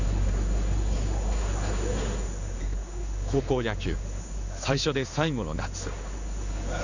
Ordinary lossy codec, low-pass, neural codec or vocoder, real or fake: none; 7.2 kHz; codec, 16 kHz in and 24 kHz out, 1 kbps, XY-Tokenizer; fake